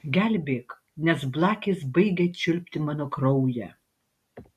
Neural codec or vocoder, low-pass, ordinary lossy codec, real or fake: none; 14.4 kHz; MP3, 64 kbps; real